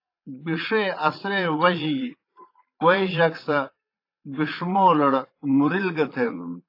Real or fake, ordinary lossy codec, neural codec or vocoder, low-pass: fake; AAC, 32 kbps; vocoder, 22.05 kHz, 80 mel bands, Vocos; 5.4 kHz